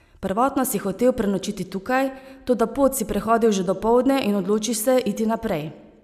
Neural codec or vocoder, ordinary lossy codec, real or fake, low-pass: none; none; real; 14.4 kHz